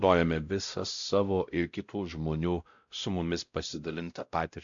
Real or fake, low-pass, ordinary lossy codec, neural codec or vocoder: fake; 7.2 kHz; AAC, 64 kbps; codec, 16 kHz, 0.5 kbps, X-Codec, WavLM features, trained on Multilingual LibriSpeech